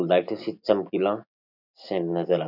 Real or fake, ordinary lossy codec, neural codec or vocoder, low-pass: real; none; none; 5.4 kHz